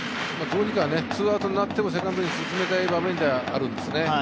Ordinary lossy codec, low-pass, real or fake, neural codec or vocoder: none; none; real; none